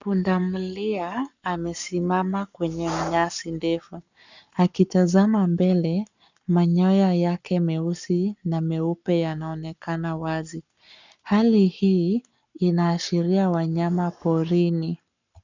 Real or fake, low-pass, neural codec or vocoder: fake; 7.2 kHz; codec, 44.1 kHz, 7.8 kbps, Pupu-Codec